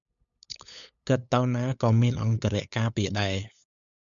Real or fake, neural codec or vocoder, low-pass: fake; codec, 16 kHz, 8 kbps, FunCodec, trained on LibriTTS, 25 frames a second; 7.2 kHz